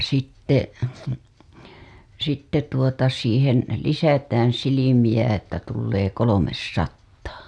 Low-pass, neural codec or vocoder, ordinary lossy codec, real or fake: none; none; none; real